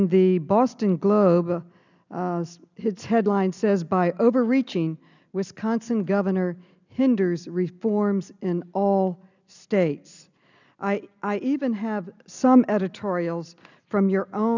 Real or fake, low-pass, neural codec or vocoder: real; 7.2 kHz; none